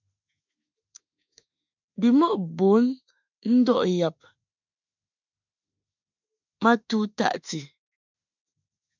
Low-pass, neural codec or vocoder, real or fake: 7.2 kHz; autoencoder, 48 kHz, 32 numbers a frame, DAC-VAE, trained on Japanese speech; fake